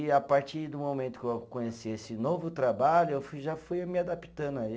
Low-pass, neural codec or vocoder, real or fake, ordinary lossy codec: none; none; real; none